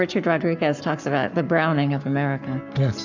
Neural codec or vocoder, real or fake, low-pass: codec, 44.1 kHz, 7.8 kbps, Pupu-Codec; fake; 7.2 kHz